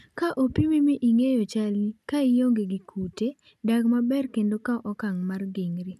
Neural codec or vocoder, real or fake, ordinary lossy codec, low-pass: none; real; none; 14.4 kHz